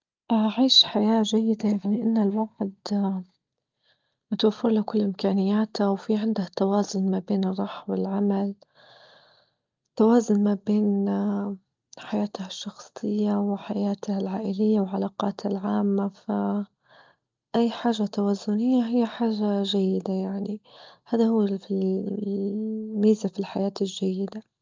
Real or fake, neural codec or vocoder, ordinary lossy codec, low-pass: real; none; Opus, 24 kbps; 7.2 kHz